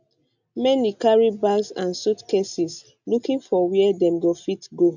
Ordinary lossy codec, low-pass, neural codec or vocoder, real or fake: none; 7.2 kHz; none; real